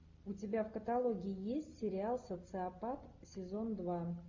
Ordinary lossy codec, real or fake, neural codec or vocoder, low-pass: AAC, 48 kbps; real; none; 7.2 kHz